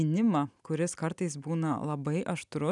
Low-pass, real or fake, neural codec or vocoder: 10.8 kHz; real; none